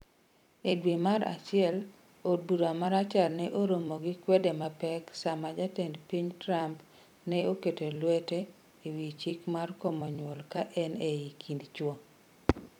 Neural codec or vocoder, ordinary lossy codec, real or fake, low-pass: vocoder, 44.1 kHz, 128 mel bands every 256 samples, BigVGAN v2; none; fake; 19.8 kHz